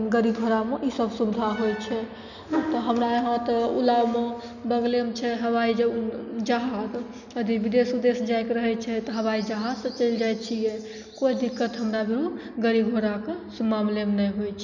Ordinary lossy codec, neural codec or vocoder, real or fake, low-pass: none; none; real; 7.2 kHz